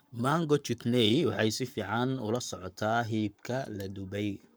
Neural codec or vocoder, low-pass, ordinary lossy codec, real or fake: codec, 44.1 kHz, 7.8 kbps, Pupu-Codec; none; none; fake